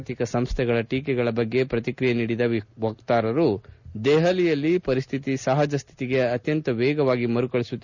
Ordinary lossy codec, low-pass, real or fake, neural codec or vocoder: none; 7.2 kHz; real; none